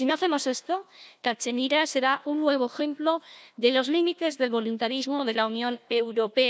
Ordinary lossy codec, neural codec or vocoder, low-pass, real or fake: none; codec, 16 kHz, 1 kbps, FunCodec, trained on Chinese and English, 50 frames a second; none; fake